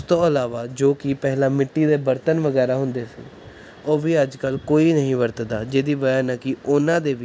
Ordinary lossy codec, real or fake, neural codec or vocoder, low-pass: none; real; none; none